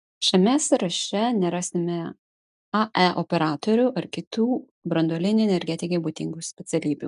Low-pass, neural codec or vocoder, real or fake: 10.8 kHz; none; real